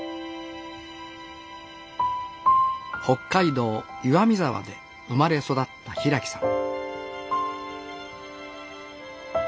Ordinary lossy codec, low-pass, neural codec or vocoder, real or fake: none; none; none; real